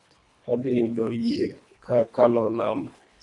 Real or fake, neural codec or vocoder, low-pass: fake; codec, 24 kHz, 1.5 kbps, HILCodec; 10.8 kHz